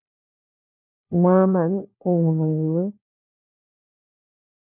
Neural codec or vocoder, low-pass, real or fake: codec, 16 kHz, 1 kbps, FunCodec, trained on LibriTTS, 50 frames a second; 3.6 kHz; fake